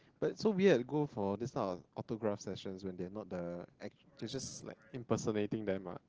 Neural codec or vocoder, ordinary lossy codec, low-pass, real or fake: none; Opus, 16 kbps; 7.2 kHz; real